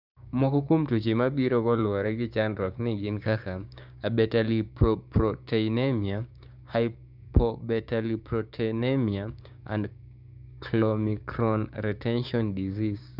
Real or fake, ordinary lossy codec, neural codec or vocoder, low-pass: fake; none; codec, 16 kHz, 6 kbps, DAC; 5.4 kHz